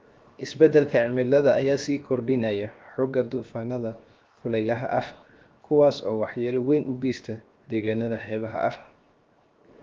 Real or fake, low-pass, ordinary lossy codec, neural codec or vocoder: fake; 7.2 kHz; Opus, 32 kbps; codec, 16 kHz, 0.7 kbps, FocalCodec